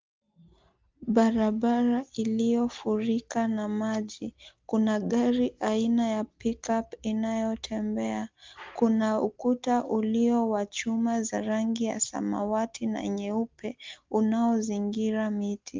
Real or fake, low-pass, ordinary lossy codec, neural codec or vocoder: real; 7.2 kHz; Opus, 32 kbps; none